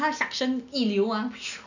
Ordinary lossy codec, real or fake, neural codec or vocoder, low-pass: none; real; none; 7.2 kHz